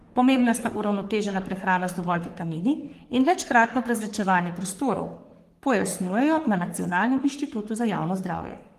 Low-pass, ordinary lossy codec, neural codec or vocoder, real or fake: 14.4 kHz; Opus, 24 kbps; codec, 44.1 kHz, 3.4 kbps, Pupu-Codec; fake